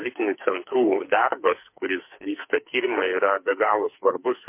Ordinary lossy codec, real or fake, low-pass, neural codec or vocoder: MP3, 24 kbps; fake; 3.6 kHz; codec, 44.1 kHz, 2.6 kbps, SNAC